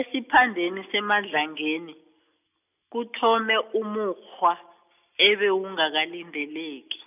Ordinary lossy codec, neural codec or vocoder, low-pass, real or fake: none; none; 3.6 kHz; real